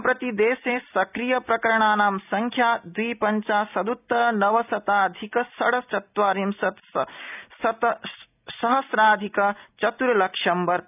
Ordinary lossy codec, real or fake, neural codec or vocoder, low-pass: none; real; none; 3.6 kHz